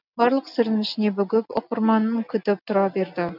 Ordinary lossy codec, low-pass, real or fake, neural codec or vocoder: none; 5.4 kHz; real; none